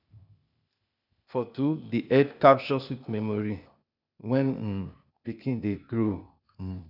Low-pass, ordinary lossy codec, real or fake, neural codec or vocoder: 5.4 kHz; none; fake; codec, 16 kHz, 0.8 kbps, ZipCodec